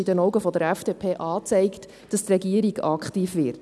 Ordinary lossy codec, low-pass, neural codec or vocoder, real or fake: none; none; none; real